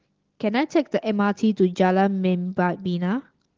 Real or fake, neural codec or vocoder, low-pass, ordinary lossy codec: real; none; 7.2 kHz; Opus, 16 kbps